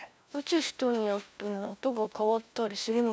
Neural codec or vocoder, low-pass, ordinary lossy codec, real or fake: codec, 16 kHz, 1 kbps, FunCodec, trained on LibriTTS, 50 frames a second; none; none; fake